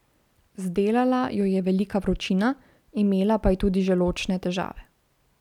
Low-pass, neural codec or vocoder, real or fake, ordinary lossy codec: 19.8 kHz; none; real; none